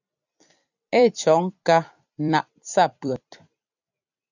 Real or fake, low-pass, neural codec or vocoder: fake; 7.2 kHz; vocoder, 44.1 kHz, 128 mel bands every 256 samples, BigVGAN v2